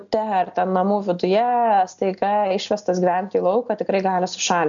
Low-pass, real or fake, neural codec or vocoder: 7.2 kHz; real; none